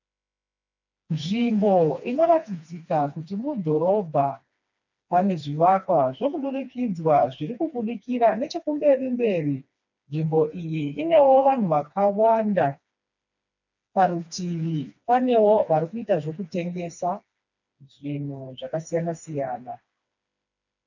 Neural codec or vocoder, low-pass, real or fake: codec, 16 kHz, 2 kbps, FreqCodec, smaller model; 7.2 kHz; fake